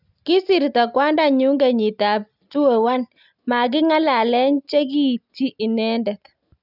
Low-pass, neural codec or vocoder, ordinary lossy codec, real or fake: 5.4 kHz; none; none; real